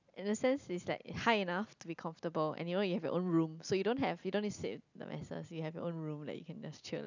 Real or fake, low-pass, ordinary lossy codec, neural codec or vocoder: real; 7.2 kHz; none; none